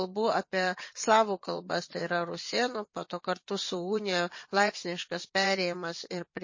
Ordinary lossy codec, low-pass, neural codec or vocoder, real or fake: MP3, 32 kbps; 7.2 kHz; vocoder, 22.05 kHz, 80 mel bands, WaveNeXt; fake